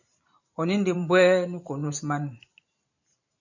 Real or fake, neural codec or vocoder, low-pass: fake; vocoder, 44.1 kHz, 128 mel bands every 512 samples, BigVGAN v2; 7.2 kHz